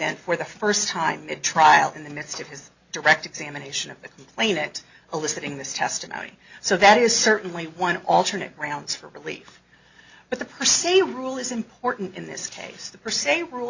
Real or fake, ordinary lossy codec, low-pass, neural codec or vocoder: real; Opus, 64 kbps; 7.2 kHz; none